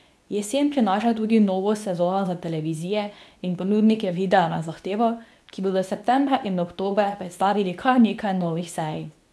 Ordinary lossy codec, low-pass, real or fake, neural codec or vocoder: none; none; fake; codec, 24 kHz, 0.9 kbps, WavTokenizer, medium speech release version 2